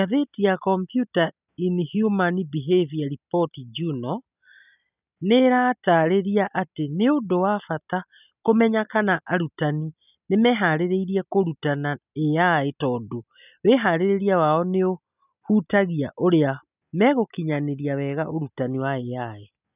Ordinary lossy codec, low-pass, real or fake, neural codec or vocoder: none; 3.6 kHz; real; none